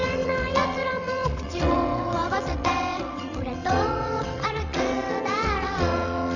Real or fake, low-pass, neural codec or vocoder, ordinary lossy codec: fake; 7.2 kHz; vocoder, 22.05 kHz, 80 mel bands, WaveNeXt; none